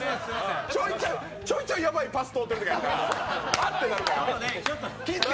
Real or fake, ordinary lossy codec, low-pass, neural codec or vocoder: real; none; none; none